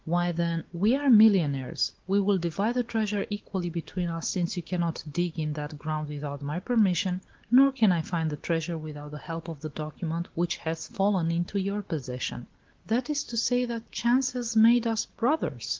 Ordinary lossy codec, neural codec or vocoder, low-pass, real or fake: Opus, 16 kbps; none; 7.2 kHz; real